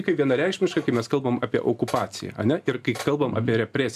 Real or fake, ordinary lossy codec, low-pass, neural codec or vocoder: real; MP3, 96 kbps; 14.4 kHz; none